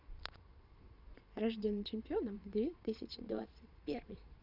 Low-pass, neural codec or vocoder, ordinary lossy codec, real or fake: 5.4 kHz; vocoder, 22.05 kHz, 80 mel bands, Vocos; none; fake